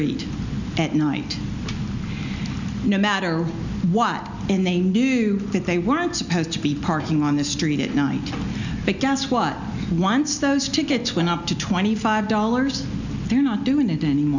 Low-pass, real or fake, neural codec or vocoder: 7.2 kHz; real; none